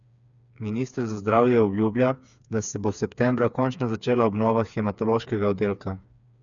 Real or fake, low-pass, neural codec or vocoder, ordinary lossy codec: fake; 7.2 kHz; codec, 16 kHz, 4 kbps, FreqCodec, smaller model; none